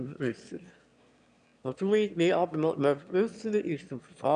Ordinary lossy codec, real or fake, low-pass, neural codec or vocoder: none; fake; 9.9 kHz; autoencoder, 22.05 kHz, a latent of 192 numbers a frame, VITS, trained on one speaker